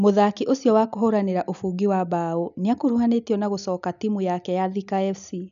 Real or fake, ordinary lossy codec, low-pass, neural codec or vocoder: real; none; 7.2 kHz; none